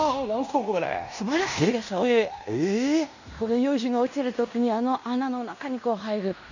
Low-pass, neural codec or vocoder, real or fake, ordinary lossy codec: 7.2 kHz; codec, 16 kHz in and 24 kHz out, 0.9 kbps, LongCat-Audio-Codec, fine tuned four codebook decoder; fake; none